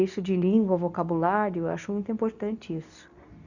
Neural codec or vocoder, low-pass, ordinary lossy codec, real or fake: codec, 24 kHz, 0.9 kbps, WavTokenizer, medium speech release version 2; 7.2 kHz; none; fake